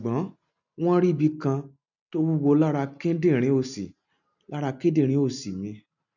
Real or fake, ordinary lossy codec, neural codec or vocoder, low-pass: real; none; none; 7.2 kHz